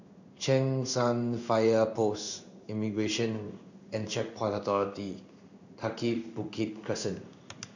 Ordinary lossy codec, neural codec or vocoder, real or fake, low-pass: none; codec, 16 kHz in and 24 kHz out, 1 kbps, XY-Tokenizer; fake; 7.2 kHz